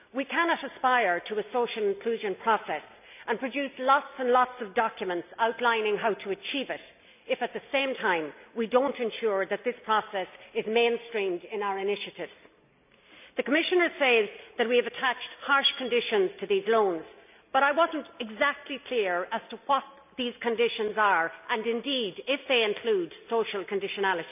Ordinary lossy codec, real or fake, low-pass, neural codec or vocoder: none; real; 3.6 kHz; none